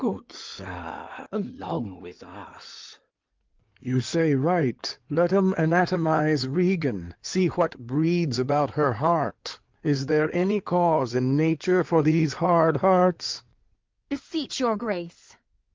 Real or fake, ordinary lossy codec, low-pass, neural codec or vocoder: fake; Opus, 32 kbps; 7.2 kHz; codec, 16 kHz in and 24 kHz out, 2.2 kbps, FireRedTTS-2 codec